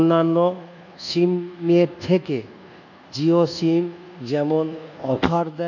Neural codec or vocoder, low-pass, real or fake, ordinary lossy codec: codec, 24 kHz, 1.2 kbps, DualCodec; 7.2 kHz; fake; none